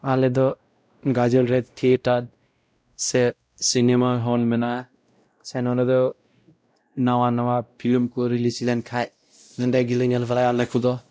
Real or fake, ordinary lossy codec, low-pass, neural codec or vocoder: fake; none; none; codec, 16 kHz, 0.5 kbps, X-Codec, WavLM features, trained on Multilingual LibriSpeech